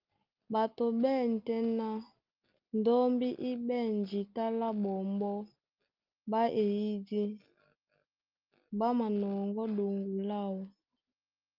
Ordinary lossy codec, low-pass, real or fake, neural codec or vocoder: Opus, 24 kbps; 5.4 kHz; real; none